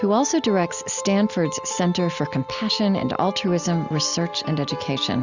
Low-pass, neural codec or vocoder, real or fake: 7.2 kHz; none; real